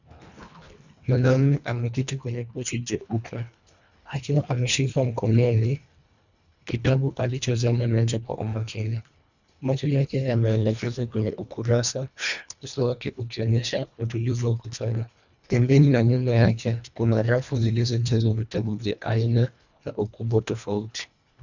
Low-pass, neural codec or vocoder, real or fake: 7.2 kHz; codec, 24 kHz, 1.5 kbps, HILCodec; fake